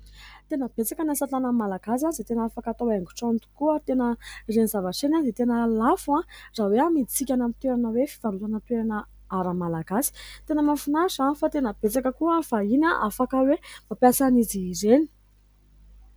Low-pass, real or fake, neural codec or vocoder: 19.8 kHz; real; none